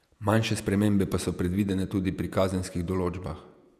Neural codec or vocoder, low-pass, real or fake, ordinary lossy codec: vocoder, 48 kHz, 128 mel bands, Vocos; 14.4 kHz; fake; none